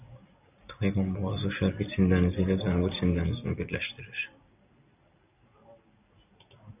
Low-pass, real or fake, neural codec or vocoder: 3.6 kHz; real; none